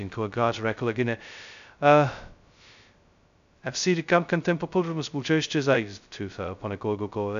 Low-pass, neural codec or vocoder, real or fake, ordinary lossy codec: 7.2 kHz; codec, 16 kHz, 0.2 kbps, FocalCodec; fake; AAC, 96 kbps